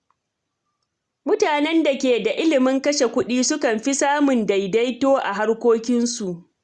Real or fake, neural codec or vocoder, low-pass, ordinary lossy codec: real; none; 10.8 kHz; Opus, 64 kbps